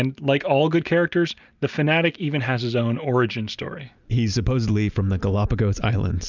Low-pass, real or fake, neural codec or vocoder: 7.2 kHz; real; none